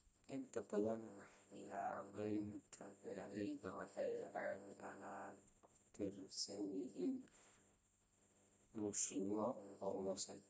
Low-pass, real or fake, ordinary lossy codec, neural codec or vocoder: none; fake; none; codec, 16 kHz, 1 kbps, FreqCodec, smaller model